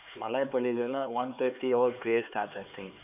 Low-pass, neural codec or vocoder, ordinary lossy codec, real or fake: 3.6 kHz; codec, 16 kHz, 4 kbps, X-Codec, HuBERT features, trained on LibriSpeech; none; fake